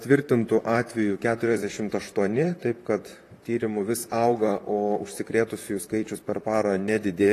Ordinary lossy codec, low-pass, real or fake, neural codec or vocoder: AAC, 48 kbps; 14.4 kHz; fake; vocoder, 44.1 kHz, 128 mel bands, Pupu-Vocoder